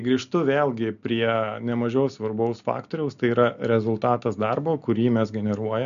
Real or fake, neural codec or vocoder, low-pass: real; none; 7.2 kHz